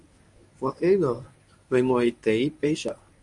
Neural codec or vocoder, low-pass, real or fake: codec, 24 kHz, 0.9 kbps, WavTokenizer, medium speech release version 1; 10.8 kHz; fake